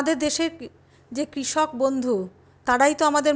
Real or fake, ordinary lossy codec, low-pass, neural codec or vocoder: real; none; none; none